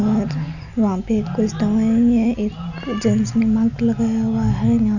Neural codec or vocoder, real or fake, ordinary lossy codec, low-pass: none; real; none; 7.2 kHz